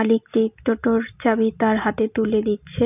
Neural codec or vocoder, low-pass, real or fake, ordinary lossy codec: none; 3.6 kHz; real; none